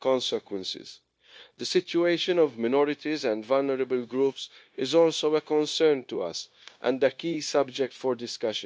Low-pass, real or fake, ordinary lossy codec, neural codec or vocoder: none; fake; none; codec, 16 kHz, 0.9 kbps, LongCat-Audio-Codec